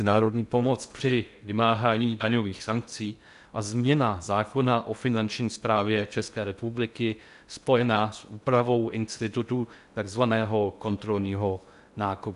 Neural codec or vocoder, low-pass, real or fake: codec, 16 kHz in and 24 kHz out, 0.6 kbps, FocalCodec, streaming, 2048 codes; 10.8 kHz; fake